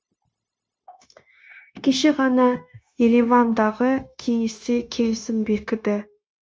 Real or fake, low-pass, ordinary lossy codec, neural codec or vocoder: fake; none; none; codec, 16 kHz, 0.9 kbps, LongCat-Audio-Codec